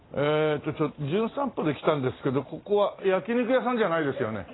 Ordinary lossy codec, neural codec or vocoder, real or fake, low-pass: AAC, 16 kbps; none; real; 7.2 kHz